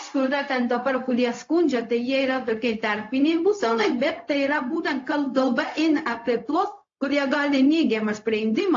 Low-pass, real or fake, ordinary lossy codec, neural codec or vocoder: 7.2 kHz; fake; AAC, 48 kbps; codec, 16 kHz, 0.4 kbps, LongCat-Audio-Codec